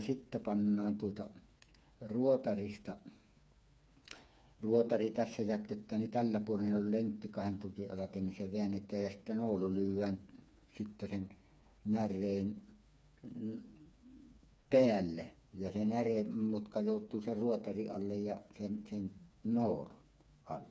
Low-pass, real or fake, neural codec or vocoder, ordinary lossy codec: none; fake; codec, 16 kHz, 4 kbps, FreqCodec, smaller model; none